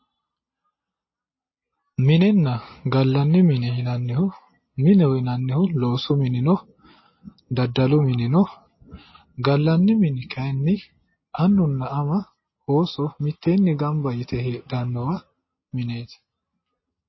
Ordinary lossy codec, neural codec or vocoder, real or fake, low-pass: MP3, 24 kbps; none; real; 7.2 kHz